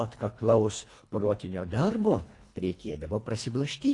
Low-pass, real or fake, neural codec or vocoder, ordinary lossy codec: 10.8 kHz; fake; codec, 24 kHz, 1.5 kbps, HILCodec; AAC, 48 kbps